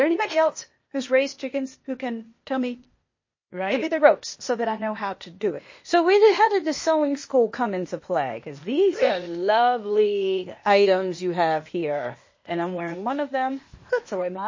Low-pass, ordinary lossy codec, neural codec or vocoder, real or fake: 7.2 kHz; MP3, 32 kbps; codec, 16 kHz, 0.8 kbps, ZipCodec; fake